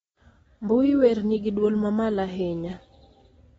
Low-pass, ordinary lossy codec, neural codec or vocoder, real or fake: 19.8 kHz; AAC, 24 kbps; none; real